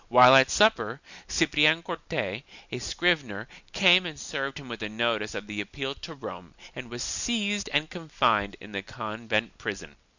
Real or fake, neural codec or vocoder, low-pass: real; none; 7.2 kHz